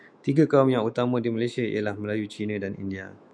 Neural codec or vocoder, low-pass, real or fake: autoencoder, 48 kHz, 128 numbers a frame, DAC-VAE, trained on Japanese speech; 9.9 kHz; fake